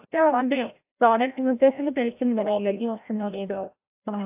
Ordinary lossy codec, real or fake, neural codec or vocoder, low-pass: AAC, 32 kbps; fake; codec, 16 kHz, 0.5 kbps, FreqCodec, larger model; 3.6 kHz